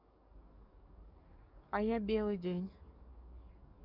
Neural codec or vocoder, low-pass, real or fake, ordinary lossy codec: codec, 44.1 kHz, 7.8 kbps, DAC; 5.4 kHz; fake; none